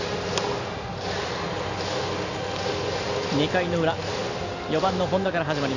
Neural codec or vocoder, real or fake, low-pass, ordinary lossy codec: none; real; 7.2 kHz; none